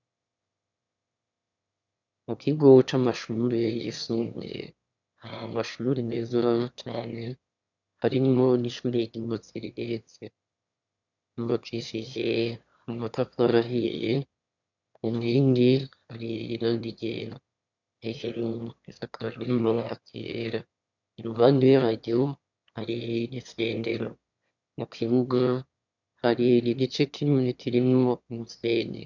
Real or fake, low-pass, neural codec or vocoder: fake; 7.2 kHz; autoencoder, 22.05 kHz, a latent of 192 numbers a frame, VITS, trained on one speaker